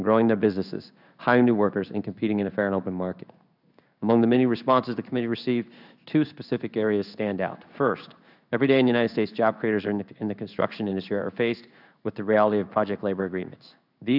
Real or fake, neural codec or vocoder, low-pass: fake; codec, 16 kHz in and 24 kHz out, 1 kbps, XY-Tokenizer; 5.4 kHz